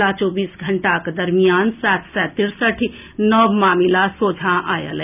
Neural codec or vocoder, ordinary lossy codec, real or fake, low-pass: none; none; real; 3.6 kHz